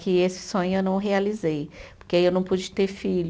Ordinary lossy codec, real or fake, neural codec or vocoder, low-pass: none; real; none; none